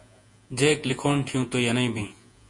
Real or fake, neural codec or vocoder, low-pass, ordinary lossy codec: fake; vocoder, 48 kHz, 128 mel bands, Vocos; 10.8 kHz; MP3, 48 kbps